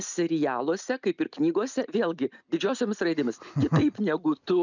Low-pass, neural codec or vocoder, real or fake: 7.2 kHz; none; real